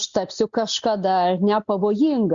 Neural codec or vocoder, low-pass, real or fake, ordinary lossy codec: none; 7.2 kHz; real; Opus, 64 kbps